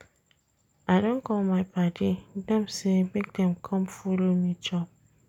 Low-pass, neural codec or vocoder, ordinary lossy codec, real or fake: 19.8 kHz; none; none; real